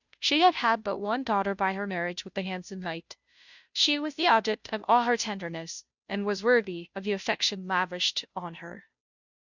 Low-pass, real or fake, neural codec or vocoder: 7.2 kHz; fake; codec, 16 kHz, 0.5 kbps, FunCodec, trained on Chinese and English, 25 frames a second